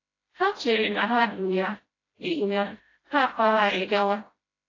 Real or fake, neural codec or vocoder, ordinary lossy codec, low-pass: fake; codec, 16 kHz, 0.5 kbps, FreqCodec, smaller model; AAC, 32 kbps; 7.2 kHz